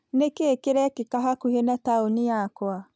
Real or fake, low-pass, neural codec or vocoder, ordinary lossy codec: real; none; none; none